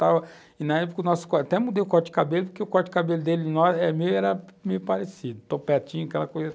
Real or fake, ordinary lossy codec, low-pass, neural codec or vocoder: real; none; none; none